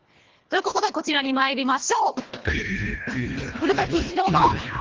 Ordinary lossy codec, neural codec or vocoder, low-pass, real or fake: Opus, 16 kbps; codec, 24 kHz, 1.5 kbps, HILCodec; 7.2 kHz; fake